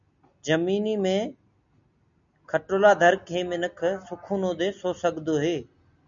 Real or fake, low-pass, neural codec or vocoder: real; 7.2 kHz; none